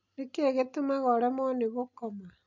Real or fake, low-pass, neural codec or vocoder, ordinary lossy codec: real; 7.2 kHz; none; none